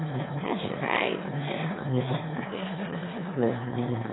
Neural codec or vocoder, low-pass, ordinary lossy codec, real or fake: autoencoder, 22.05 kHz, a latent of 192 numbers a frame, VITS, trained on one speaker; 7.2 kHz; AAC, 16 kbps; fake